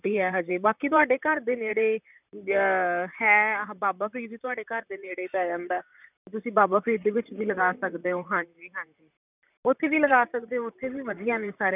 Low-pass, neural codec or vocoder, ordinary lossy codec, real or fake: 3.6 kHz; vocoder, 44.1 kHz, 128 mel bands, Pupu-Vocoder; none; fake